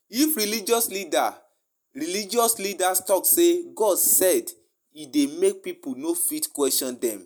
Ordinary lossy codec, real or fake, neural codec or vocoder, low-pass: none; real; none; none